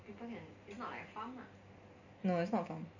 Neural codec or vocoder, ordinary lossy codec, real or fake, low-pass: none; none; real; 7.2 kHz